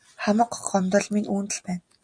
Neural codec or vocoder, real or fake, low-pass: none; real; 9.9 kHz